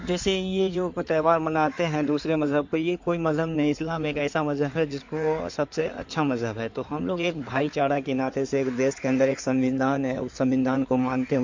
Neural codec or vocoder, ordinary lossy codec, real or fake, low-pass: codec, 16 kHz in and 24 kHz out, 2.2 kbps, FireRedTTS-2 codec; MP3, 48 kbps; fake; 7.2 kHz